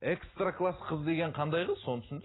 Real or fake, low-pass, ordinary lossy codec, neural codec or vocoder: real; 7.2 kHz; AAC, 16 kbps; none